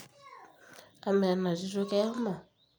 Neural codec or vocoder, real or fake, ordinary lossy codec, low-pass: none; real; none; none